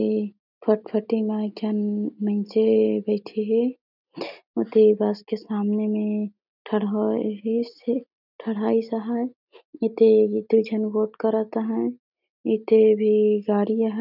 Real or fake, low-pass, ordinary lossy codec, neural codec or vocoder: real; 5.4 kHz; none; none